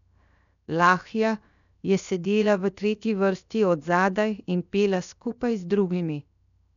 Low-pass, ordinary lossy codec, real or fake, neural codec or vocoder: 7.2 kHz; none; fake; codec, 16 kHz, 0.7 kbps, FocalCodec